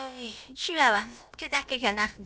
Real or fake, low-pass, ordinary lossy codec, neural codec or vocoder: fake; none; none; codec, 16 kHz, about 1 kbps, DyCAST, with the encoder's durations